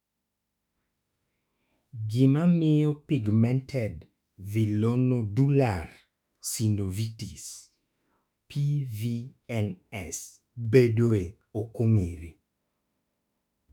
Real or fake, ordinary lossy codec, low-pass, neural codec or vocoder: fake; none; 19.8 kHz; autoencoder, 48 kHz, 32 numbers a frame, DAC-VAE, trained on Japanese speech